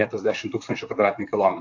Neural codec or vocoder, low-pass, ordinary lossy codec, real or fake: vocoder, 44.1 kHz, 128 mel bands, Pupu-Vocoder; 7.2 kHz; AAC, 48 kbps; fake